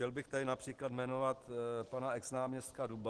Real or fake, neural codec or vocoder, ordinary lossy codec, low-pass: real; none; Opus, 16 kbps; 10.8 kHz